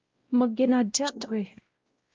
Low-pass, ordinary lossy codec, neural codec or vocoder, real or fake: 7.2 kHz; Opus, 32 kbps; codec, 16 kHz, 0.5 kbps, X-Codec, WavLM features, trained on Multilingual LibriSpeech; fake